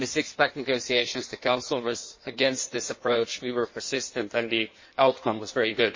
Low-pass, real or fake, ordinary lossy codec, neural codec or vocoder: 7.2 kHz; fake; MP3, 32 kbps; codec, 16 kHz in and 24 kHz out, 1.1 kbps, FireRedTTS-2 codec